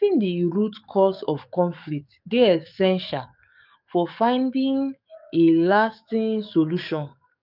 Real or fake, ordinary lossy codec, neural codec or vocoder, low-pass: fake; none; codec, 44.1 kHz, 7.8 kbps, DAC; 5.4 kHz